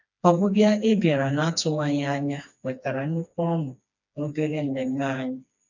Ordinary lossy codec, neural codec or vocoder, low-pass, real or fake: none; codec, 16 kHz, 2 kbps, FreqCodec, smaller model; 7.2 kHz; fake